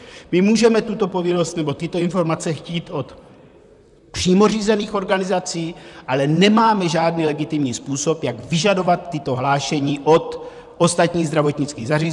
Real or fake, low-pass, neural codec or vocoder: fake; 10.8 kHz; vocoder, 44.1 kHz, 128 mel bands, Pupu-Vocoder